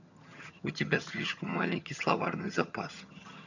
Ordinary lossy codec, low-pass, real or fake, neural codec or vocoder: none; 7.2 kHz; fake; vocoder, 22.05 kHz, 80 mel bands, HiFi-GAN